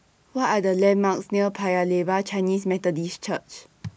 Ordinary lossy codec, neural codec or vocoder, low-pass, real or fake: none; none; none; real